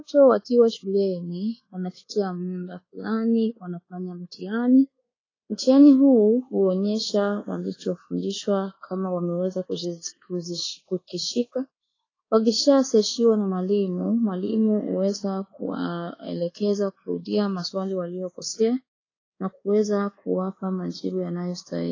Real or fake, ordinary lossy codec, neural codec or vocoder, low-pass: fake; AAC, 32 kbps; codec, 24 kHz, 1.2 kbps, DualCodec; 7.2 kHz